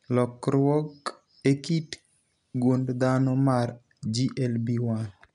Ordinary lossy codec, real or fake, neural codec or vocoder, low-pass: none; real; none; 10.8 kHz